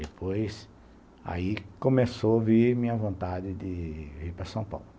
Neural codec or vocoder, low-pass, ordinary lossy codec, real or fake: none; none; none; real